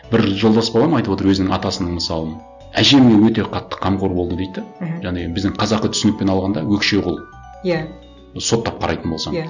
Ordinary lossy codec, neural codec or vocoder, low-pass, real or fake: none; none; 7.2 kHz; real